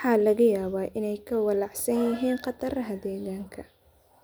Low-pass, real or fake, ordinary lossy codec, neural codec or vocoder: none; real; none; none